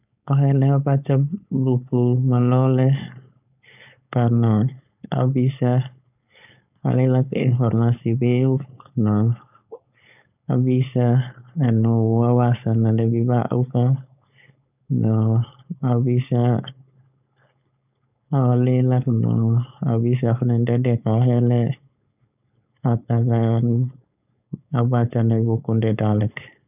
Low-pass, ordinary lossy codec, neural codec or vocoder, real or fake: 3.6 kHz; none; codec, 16 kHz, 4.8 kbps, FACodec; fake